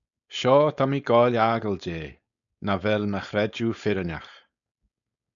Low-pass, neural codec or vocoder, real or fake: 7.2 kHz; codec, 16 kHz, 4.8 kbps, FACodec; fake